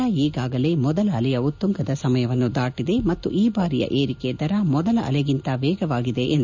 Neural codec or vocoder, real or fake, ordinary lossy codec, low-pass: none; real; none; 7.2 kHz